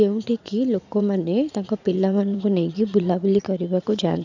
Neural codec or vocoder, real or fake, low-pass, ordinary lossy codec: vocoder, 44.1 kHz, 80 mel bands, Vocos; fake; 7.2 kHz; none